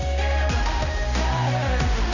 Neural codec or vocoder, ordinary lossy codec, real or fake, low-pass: codec, 16 kHz, 1 kbps, X-Codec, HuBERT features, trained on balanced general audio; none; fake; 7.2 kHz